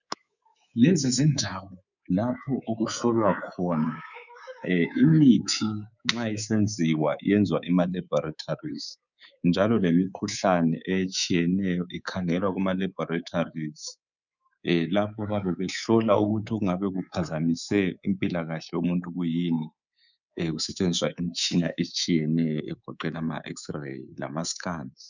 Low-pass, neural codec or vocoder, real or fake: 7.2 kHz; codec, 24 kHz, 3.1 kbps, DualCodec; fake